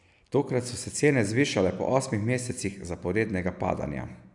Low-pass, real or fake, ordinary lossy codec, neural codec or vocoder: 10.8 kHz; real; none; none